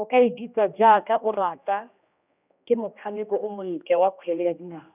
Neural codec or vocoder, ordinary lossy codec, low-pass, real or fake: codec, 16 kHz, 1 kbps, X-Codec, HuBERT features, trained on general audio; none; 3.6 kHz; fake